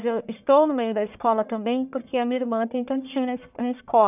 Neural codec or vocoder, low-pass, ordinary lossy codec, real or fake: codec, 44.1 kHz, 1.7 kbps, Pupu-Codec; 3.6 kHz; none; fake